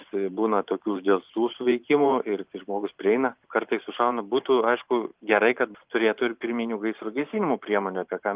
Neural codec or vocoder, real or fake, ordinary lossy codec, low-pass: vocoder, 44.1 kHz, 128 mel bands every 512 samples, BigVGAN v2; fake; Opus, 32 kbps; 3.6 kHz